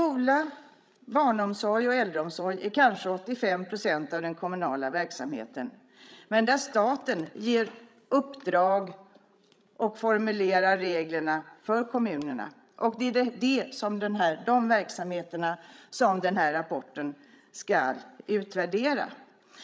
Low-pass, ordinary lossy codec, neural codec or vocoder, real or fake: none; none; codec, 16 kHz, 16 kbps, FreqCodec, larger model; fake